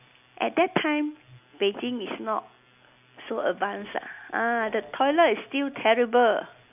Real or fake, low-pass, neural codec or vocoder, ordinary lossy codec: real; 3.6 kHz; none; none